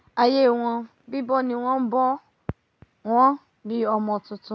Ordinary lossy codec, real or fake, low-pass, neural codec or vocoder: none; real; none; none